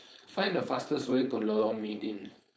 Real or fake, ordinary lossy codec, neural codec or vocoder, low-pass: fake; none; codec, 16 kHz, 4.8 kbps, FACodec; none